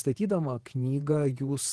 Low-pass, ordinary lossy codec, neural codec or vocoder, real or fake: 10.8 kHz; Opus, 16 kbps; vocoder, 48 kHz, 128 mel bands, Vocos; fake